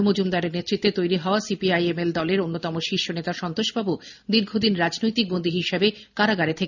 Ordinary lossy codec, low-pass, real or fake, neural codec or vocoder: none; 7.2 kHz; real; none